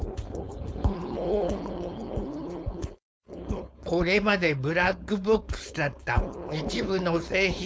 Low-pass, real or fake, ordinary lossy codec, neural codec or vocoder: none; fake; none; codec, 16 kHz, 4.8 kbps, FACodec